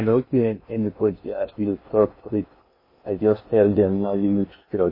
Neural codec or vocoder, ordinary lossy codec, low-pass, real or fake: codec, 16 kHz in and 24 kHz out, 0.6 kbps, FocalCodec, streaming, 2048 codes; MP3, 24 kbps; 5.4 kHz; fake